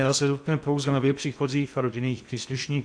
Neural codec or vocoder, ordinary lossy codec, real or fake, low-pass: codec, 16 kHz in and 24 kHz out, 0.8 kbps, FocalCodec, streaming, 65536 codes; AAC, 64 kbps; fake; 9.9 kHz